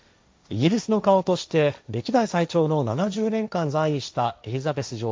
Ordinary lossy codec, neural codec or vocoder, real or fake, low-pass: none; codec, 16 kHz, 1.1 kbps, Voila-Tokenizer; fake; none